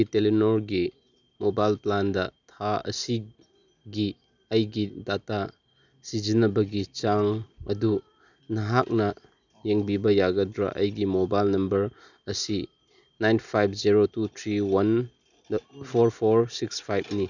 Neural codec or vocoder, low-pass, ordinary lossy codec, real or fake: none; 7.2 kHz; none; real